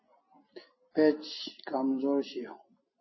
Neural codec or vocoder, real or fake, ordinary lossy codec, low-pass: none; real; MP3, 24 kbps; 7.2 kHz